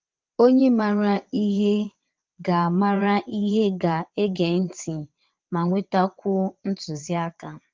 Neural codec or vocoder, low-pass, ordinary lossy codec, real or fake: vocoder, 22.05 kHz, 80 mel bands, WaveNeXt; 7.2 kHz; Opus, 32 kbps; fake